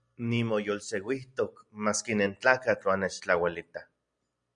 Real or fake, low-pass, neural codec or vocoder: real; 9.9 kHz; none